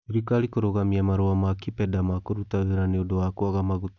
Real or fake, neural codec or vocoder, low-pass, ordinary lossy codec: real; none; 7.2 kHz; none